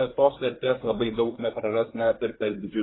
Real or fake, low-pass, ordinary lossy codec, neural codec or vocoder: fake; 7.2 kHz; AAC, 16 kbps; codec, 24 kHz, 1 kbps, SNAC